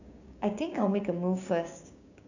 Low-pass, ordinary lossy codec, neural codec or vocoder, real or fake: 7.2 kHz; none; codec, 16 kHz, 6 kbps, DAC; fake